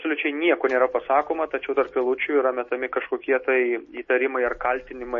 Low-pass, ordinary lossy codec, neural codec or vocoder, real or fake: 7.2 kHz; MP3, 32 kbps; none; real